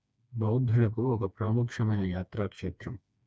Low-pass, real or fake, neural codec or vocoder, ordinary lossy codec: none; fake; codec, 16 kHz, 2 kbps, FreqCodec, smaller model; none